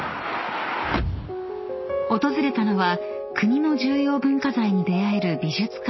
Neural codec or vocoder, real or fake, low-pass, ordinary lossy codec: none; real; 7.2 kHz; MP3, 24 kbps